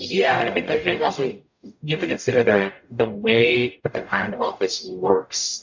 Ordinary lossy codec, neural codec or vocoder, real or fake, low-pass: MP3, 64 kbps; codec, 44.1 kHz, 0.9 kbps, DAC; fake; 7.2 kHz